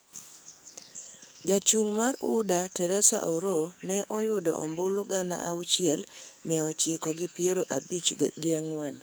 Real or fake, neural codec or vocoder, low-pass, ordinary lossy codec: fake; codec, 44.1 kHz, 2.6 kbps, SNAC; none; none